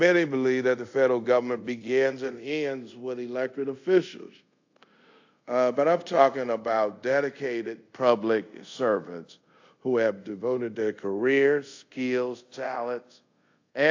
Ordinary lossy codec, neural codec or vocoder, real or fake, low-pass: AAC, 48 kbps; codec, 24 kHz, 0.5 kbps, DualCodec; fake; 7.2 kHz